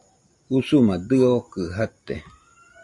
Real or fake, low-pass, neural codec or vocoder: real; 10.8 kHz; none